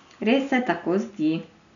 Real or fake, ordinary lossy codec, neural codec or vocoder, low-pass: real; none; none; 7.2 kHz